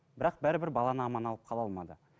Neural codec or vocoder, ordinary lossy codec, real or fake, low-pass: none; none; real; none